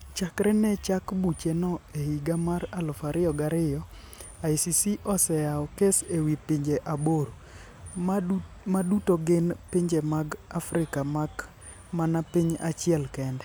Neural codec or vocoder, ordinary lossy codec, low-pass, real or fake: none; none; none; real